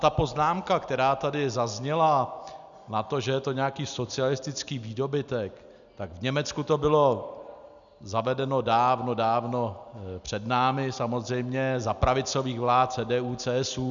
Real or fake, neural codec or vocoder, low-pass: real; none; 7.2 kHz